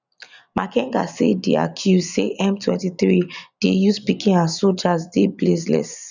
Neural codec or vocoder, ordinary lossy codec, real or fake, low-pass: none; none; real; 7.2 kHz